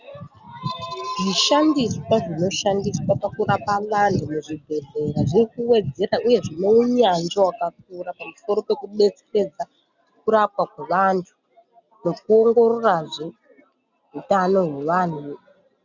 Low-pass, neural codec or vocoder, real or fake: 7.2 kHz; none; real